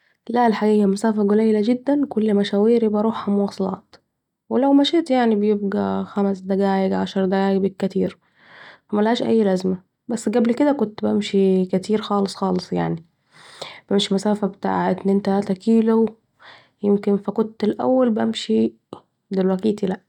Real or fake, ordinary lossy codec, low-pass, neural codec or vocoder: real; none; 19.8 kHz; none